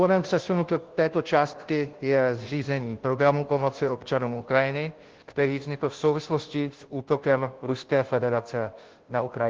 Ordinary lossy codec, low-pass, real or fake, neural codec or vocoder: Opus, 16 kbps; 7.2 kHz; fake; codec, 16 kHz, 0.5 kbps, FunCodec, trained on Chinese and English, 25 frames a second